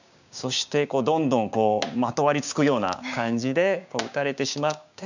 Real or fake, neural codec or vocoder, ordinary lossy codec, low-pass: fake; codec, 16 kHz, 6 kbps, DAC; none; 7.2 kHz